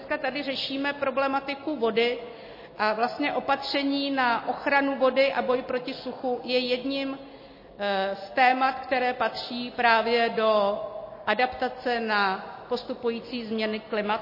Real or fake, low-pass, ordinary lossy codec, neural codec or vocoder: real; 5.4 kHz; MP3, 24 kbps; none